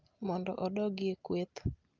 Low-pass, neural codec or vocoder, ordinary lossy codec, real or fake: 7.2 kHz; none; Opus, 24 kbps; real